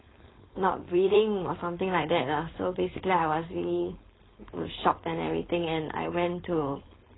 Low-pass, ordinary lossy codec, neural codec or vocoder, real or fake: 7.2 kHz; AAC, 16 kbps; codec, 16 kHz, 4.8 kbps, FACodec; fake